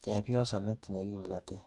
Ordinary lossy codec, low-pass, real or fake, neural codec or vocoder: none; 10.8 kHz; fake; codec, 44.1 kHz, 2.6 kbps, DAC